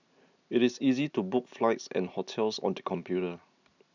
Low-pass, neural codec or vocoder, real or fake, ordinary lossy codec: 7.2 kHz; none; real; none